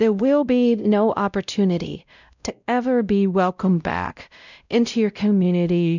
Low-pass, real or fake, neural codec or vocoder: 7.2 kHz; fake; codec, 16 kHz, 0.5 kbps, X-Codec, WavLM features, trained on Multilingual LibriSpeech